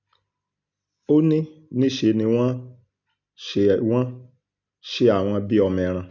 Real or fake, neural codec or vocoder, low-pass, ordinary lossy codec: real; none; 7.2 kHz; none